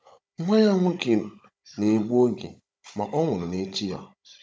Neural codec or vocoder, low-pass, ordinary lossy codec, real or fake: codec, 16 kHz, 16 kbps, FunCodec, trained on Chinese and English, 50 frames a second; none; none; fake